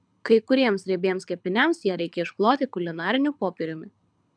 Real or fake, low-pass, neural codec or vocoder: fake; 9.9 kHz; codec, 24 kHz, 6 kbps, HILCodec